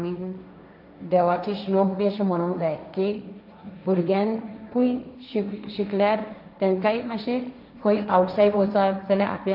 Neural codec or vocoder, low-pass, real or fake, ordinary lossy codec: codec, 16 kHz, 1.1 kbps, Voila-Tokenizer; 5.4 kHz; fake; none